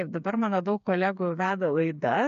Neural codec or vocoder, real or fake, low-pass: codec, 16 kHz, 4 kbps, FreqCodec, smaller model; fake; 7.2 kHz